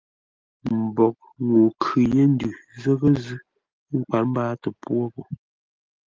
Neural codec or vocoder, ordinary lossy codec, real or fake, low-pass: none; Opus, 32 kbps; real; 7.2 kHz